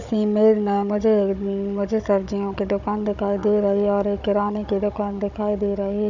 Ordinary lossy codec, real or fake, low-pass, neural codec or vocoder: none; fake; 7.2 kHz; codec, 16 kHz, 16 kbps, FunCodec, trained on Chinese and English, 50 frames a second